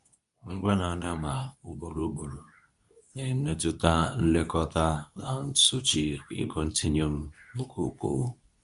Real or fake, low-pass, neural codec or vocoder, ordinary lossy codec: fake; 10.8 kHz; codec, 24 kHz, 0.9 kbps, WavTokenizer, medium speech release version 2; none